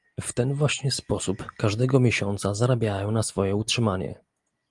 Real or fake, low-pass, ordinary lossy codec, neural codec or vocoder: real; 10.8 kHz; Opus, 32 kbps; none